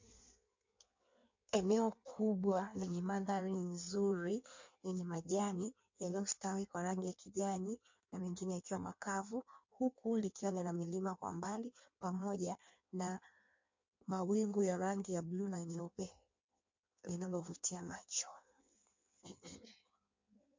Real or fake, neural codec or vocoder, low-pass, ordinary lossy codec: fake; codec, 16 kHz in and 24 kHz out, 1.1 kbps, FireRedTTS-2 codec; 7.2 kHz; MP3, 48 kbps